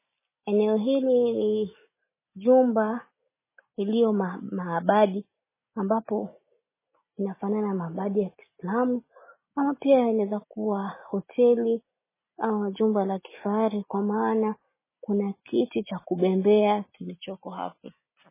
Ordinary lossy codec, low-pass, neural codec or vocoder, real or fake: MP3, 16 kbps; 3.6 kHz; none; real